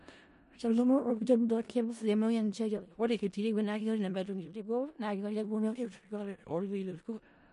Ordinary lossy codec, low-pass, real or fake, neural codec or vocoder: MP3, 48 kbps; 10.8 kHz; fake; codec, 16 kHz in and 24 kHz out, 0.4 kbps, LongCat-Audio-Codec, four codebook decoder